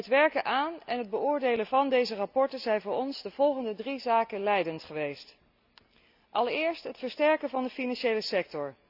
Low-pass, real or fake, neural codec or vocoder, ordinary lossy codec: 5.4 kHz; real; none; none